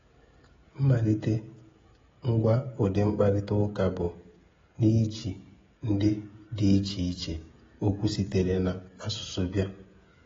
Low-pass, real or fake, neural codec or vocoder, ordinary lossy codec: 7.2 kHz; fake; codec, 16 kHz, 16 kbps, FreqCodec, smaller model; AAC, 24 kbps